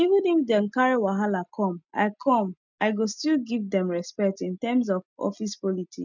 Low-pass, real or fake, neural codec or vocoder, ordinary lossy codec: 7.2 kHz; real; none; none